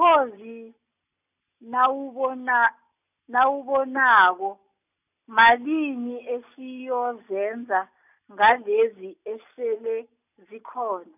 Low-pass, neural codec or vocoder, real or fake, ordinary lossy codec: 3.6 kHz; none; real; none